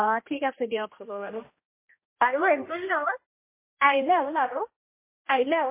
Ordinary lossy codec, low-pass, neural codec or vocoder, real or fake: MP3, 24 kbps; 3.6 kHz; codec, 16 kHz, 1 kbps, X-Codec, HuBERT features, trained on general audio; fake